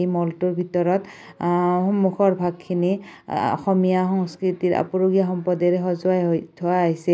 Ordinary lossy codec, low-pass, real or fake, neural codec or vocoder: none; none; real; none